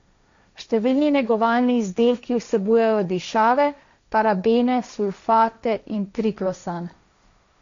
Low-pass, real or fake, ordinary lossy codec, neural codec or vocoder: 7.2 kHz; fake; MP3, 64 kbps; codec, 16 kHz, 1.1 kbps, Voila-Tokenizer